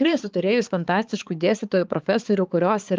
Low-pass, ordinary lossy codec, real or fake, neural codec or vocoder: 7.2 kHz; Opus, 24 kbps; fake; codec, 16 kHz, 4 kbps, X-Codec, HuBERT features, trained on balanced general audio